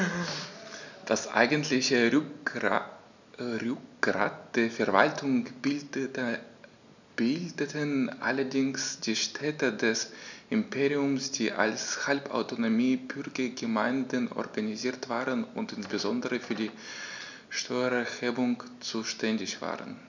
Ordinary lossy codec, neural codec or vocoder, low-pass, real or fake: none; none; 7.2 kHz; real